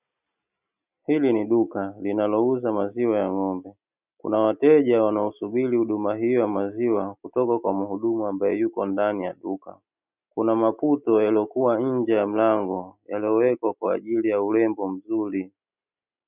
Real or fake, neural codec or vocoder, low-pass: real; none; 3.6 kHz